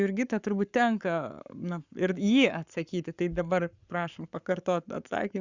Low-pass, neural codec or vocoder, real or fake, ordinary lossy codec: 7.2 kHz; codec, 44.1 kHz, 7.8 kbps, Pupu-Codec; fake; Opus, 64 kbps